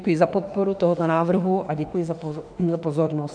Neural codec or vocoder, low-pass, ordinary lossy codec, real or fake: autoencoder, 48 kHz, 32 numbers a frame, DAC-VAE, trained on Japanese speech; 9.9 kHz; MP3, 96 kbps; fake